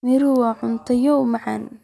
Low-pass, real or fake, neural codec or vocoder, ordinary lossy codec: none; real; none; none